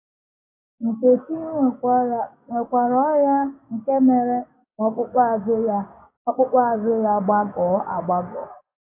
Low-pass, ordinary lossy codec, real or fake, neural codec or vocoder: 3.6 kHz; AAC, 24 kbps; fake; codec, 16 kHz, 6 kbps, DAC